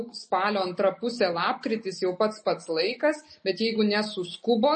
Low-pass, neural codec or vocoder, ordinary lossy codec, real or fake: 10.8 kHz; none; MP3, 32 kbps; real